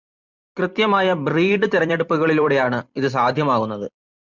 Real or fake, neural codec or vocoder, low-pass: fake; vocoder, 44.1 kHz, 128 mel bands every 256 samples, BigVGAN v2; 7.2 kHz